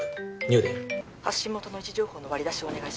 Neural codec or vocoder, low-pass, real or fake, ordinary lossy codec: none; none; real; none